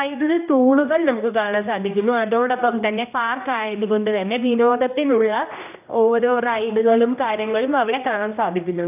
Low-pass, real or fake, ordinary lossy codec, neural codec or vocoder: 3.6 kHz; fake; none; codec, 16 kHz, 1 kbps, X-Codec, HuBERT features, trained on general audio